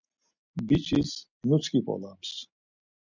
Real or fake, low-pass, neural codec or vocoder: real; 7.2 kHz; none